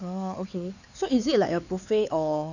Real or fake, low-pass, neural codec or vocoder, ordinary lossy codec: fake; 7.2 kHz; codec, 16 kHz, 4 kbps, X-Codec, HuBERT features, trained on LibriSpeech; none